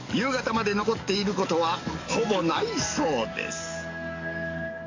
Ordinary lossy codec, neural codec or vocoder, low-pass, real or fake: AAC, 48 kbps; codec, 44.1 kHz, 7.8 kbps, DAC; 7.2 kHz; fake